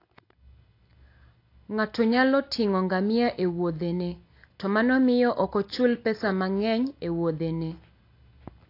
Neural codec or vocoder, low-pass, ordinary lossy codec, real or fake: none; 5.4 kHz; AAC, 32 kbps; real